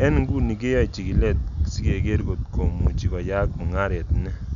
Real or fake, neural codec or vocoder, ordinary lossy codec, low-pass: real; none; none; 7.2 kHz